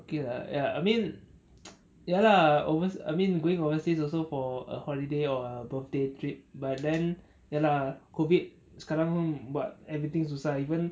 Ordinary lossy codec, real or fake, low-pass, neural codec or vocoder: none; real; none; none